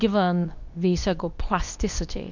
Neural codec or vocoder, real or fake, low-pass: codec, 24 kHz, 0.9 kbps, WavTokenizer, medium speech release version 2; fake; 7.2 kHz